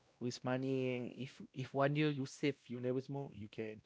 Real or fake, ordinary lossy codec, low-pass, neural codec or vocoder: fake; none; none; codec, 16 kHz, 1 kbps, X-Codec, WavLM features, trained on Multilingual LibriSpeech